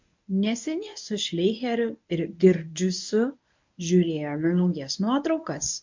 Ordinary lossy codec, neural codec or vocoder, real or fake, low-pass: MP3, 48 kbps; codec, 24 kHz, 0.9 kbps, WavTokenizer, medium speech release version 1; fake; 7.2 kHz